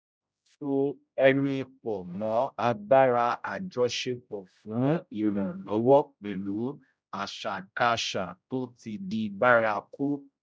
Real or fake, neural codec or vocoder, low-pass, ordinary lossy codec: fake; codec, 16 kHz, 0.5 kbps, X-Codec, HuBERT features, trained on general audio; none; none